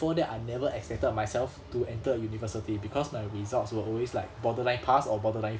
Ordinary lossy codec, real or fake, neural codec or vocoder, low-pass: none; real; none; none